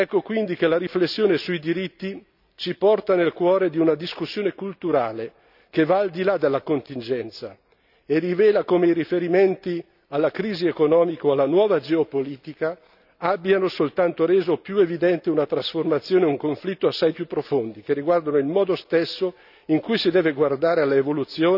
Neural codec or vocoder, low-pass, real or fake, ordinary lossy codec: none; 5.4 kHz; real; none